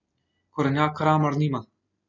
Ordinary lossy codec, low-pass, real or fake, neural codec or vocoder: none; 7.2 kHz; real; none